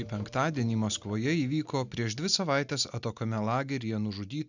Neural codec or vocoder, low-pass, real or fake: none; 7.2 kHz; real